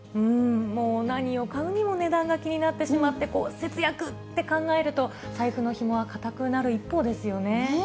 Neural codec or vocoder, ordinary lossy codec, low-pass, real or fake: none; none; none; real